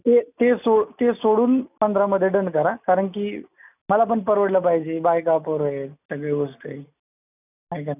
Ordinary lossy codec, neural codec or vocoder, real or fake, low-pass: none; none; real; 3.6 kHz